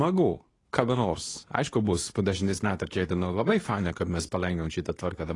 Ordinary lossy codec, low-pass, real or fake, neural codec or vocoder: AAC, 32 kbps; 10.8 kHz; fake; codec, 24 kHz, 0.9 kbps, WavTokenizer, medium speech release version 2